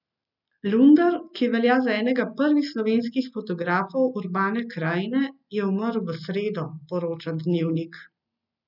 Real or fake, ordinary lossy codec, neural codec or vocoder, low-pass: real; none; none; 5.4 kHz